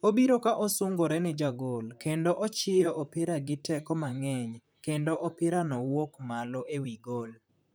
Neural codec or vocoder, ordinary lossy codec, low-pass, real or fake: vocoder, 44.1 kHz, 128 mel bands, Pupu-Vocoder; none; none; fake